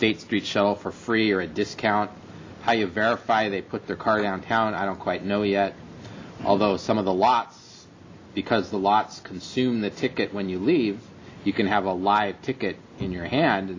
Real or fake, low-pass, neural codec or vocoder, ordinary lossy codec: real; 7.2 kHz; none; MP3, 64 kbps